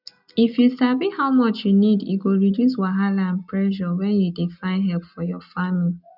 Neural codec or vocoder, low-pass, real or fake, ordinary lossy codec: none; 5.4 kHz; real; none